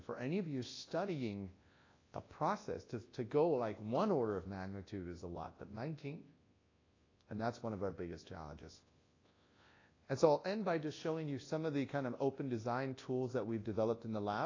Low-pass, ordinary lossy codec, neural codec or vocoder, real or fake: 7.2 kHz; AAC, 32 kbps; codec, 24 kHz, 0.9 kbps, WavTokenizer, large speech release; fake